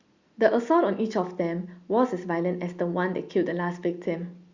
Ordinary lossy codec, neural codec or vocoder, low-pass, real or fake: Opus, 64 kbps; none; 7.2 kHz; real